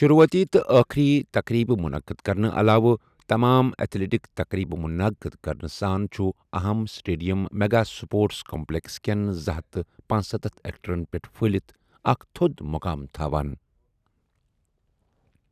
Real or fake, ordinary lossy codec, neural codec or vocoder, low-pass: real; none; none; 14.4 kHz